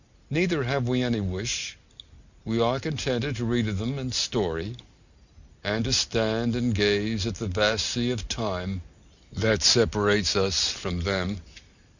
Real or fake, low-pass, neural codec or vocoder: real; 7.2 kHz; none